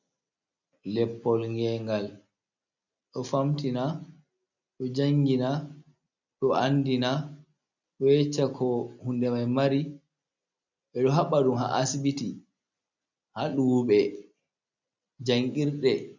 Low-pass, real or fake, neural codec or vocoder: 7.2 kHz; real; none